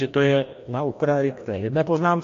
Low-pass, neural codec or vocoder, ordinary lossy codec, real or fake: 7.2 kHz; codec, 16 kHz, 1 kbps, FreqCodec, larger model; AAC, 48 kbps; fake